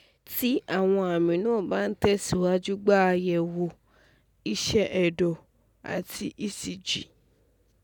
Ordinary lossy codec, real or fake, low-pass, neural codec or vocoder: none; real; 19.8 kHz; none